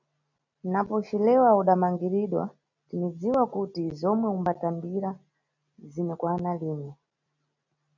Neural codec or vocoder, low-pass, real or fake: none; 7.2 kHz; real